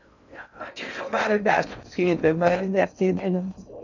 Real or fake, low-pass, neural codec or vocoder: fake; 7.2 kHz; codec, 16 kHz in and 24 kHz out, 0.6 kbps, FocalCodec, streaming, 4096 codes